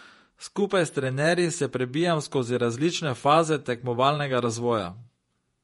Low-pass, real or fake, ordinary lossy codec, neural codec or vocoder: 19.8 kHz; fake; MP3, 48 kbps; vocoder, 48 kHz, 128 mel bands, Vocos